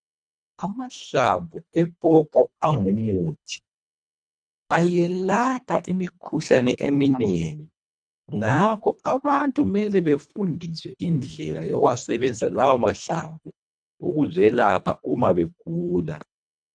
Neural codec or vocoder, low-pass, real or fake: codec, 24 kHz, 1.5 kbps, HILCodec; 9.9 kHz; fake